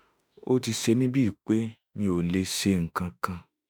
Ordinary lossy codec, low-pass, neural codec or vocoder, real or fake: none; none; autoencoder, 48 kHz, 32 numbers a frame, DAC-VAE, trained on Japanese speech; fake